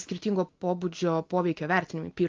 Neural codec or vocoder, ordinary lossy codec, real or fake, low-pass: none; Opus, 24 kbps; real; 7.2 kHz